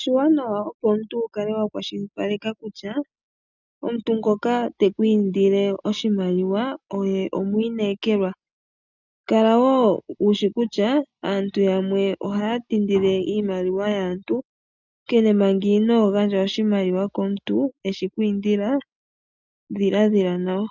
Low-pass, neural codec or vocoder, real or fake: 7.2 kHz; none; real